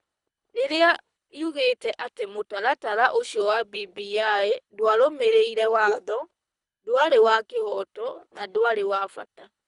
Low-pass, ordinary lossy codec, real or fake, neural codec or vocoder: 10.8 kHz; Opus, 64 kbps; fake; codec, 24 kHz, 3 kbps, HILCodec